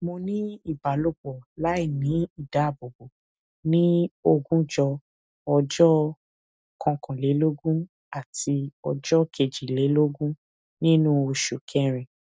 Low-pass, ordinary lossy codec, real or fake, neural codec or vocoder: none; none; real; none